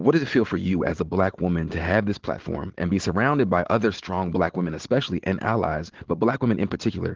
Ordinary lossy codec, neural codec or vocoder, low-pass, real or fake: Opus, 16 kbps; none; 7.2 kHz; real